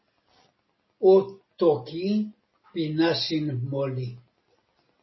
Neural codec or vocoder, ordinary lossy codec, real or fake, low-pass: none; MP3, 24 kbps; real; 7.2 kHz